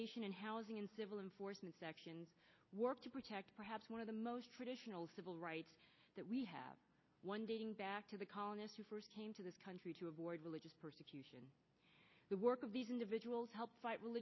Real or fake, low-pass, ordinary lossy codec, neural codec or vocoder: real; 7.2 kHz; MP3, 24 kbps; none